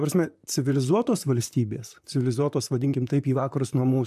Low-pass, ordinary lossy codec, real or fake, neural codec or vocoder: 14.4 kHz; AAC, 96 kbps; fake; vocoder, 44.1 kHz, 128 mel bands, Pupu-Vocoder